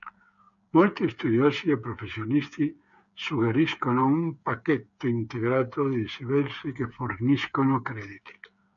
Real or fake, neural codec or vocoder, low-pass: fake; codec, 16 kHz, 8 kbps, FreqCodec, smaller model; 7.2 kHz